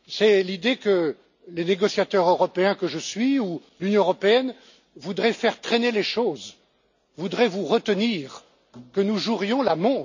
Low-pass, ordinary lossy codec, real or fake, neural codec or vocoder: 7.2 kHz; none; real; none